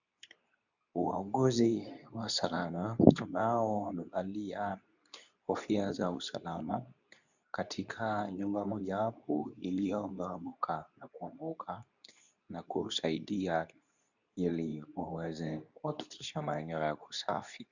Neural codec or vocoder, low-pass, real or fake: codec, 24 kHz, 0.9 kbps, WavTokenizer, medium speech release version 2; 7.2 kHz; fake